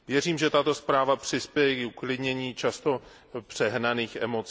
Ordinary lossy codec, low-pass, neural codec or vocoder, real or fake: none; none; none; real